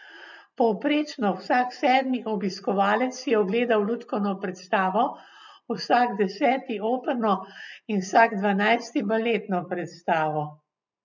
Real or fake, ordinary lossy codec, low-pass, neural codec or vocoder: real; none; 7.2 kHz; none